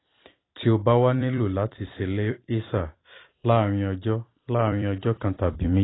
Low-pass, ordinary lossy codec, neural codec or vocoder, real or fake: 7.2 kHz; AAC, 16 kbps; vocoder, 44.1 kHz, 128 mel bands every 256 samples, BigVGAN v2; fake